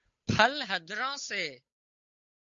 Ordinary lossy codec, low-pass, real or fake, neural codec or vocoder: MP3, 48 kbps; 7.2 kHz; fake; codec, 16 kHz, 8 kbps, FunCodec, trained on Chinese and English, 25 frames a second